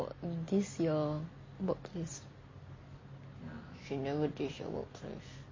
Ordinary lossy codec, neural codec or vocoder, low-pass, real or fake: MP3, 32 kbps; none; 7.2 kHz; real